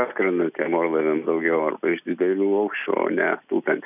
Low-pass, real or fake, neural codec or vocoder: 3.6 kHz; real; none